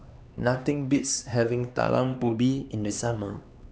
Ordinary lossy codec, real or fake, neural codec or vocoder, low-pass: none; fake; codec, 16 kHz, 2 kbps, X-Codec, HuBERT features, trained on LibriSpeech; none